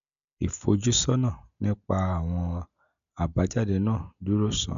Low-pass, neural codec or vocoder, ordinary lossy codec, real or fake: 7.2 kHz; none; none; real